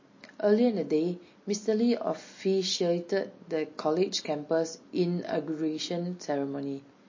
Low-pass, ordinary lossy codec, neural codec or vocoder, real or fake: 7.2 kHz; MP3, 32 kbps; none; real